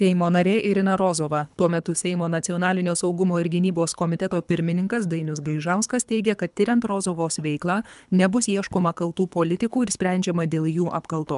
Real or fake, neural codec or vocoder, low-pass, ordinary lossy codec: fake; codec, 24 kHz, 3 kbps, HILCodec; 10.8 kHz; MP3, 96 kbps